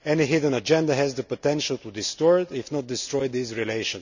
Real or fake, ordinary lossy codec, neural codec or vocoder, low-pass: real; none; none; 7.2 kHz